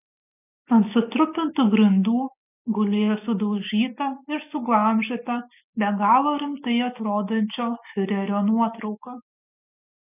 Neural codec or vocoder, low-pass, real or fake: none; 3.6 kHz; real